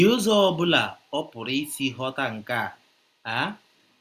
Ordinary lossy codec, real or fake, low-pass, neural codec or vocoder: Opus, 64 kbps; real; 14.4 kHz; none